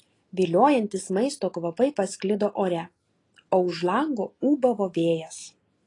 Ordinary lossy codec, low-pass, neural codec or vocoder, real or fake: AAC, 32 kbps; 10.8 kHz; none; real